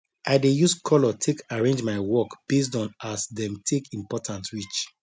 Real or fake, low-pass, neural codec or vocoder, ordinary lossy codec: real; none; none; none